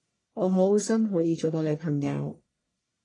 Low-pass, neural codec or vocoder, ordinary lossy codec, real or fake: 10.8 kHz; codec, 44.1 kHz, 1.7 kbps, Pupu-Codec; AAC, 32 kbps; fake